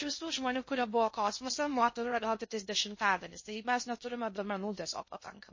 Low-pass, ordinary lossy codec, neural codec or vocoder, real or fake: 7.2 kHz; MP3, 32 kbps; codec, 16 kHz in and 24 kHz out, 0.6 kbps, FocalCodec, streaming, 2048 codes; fake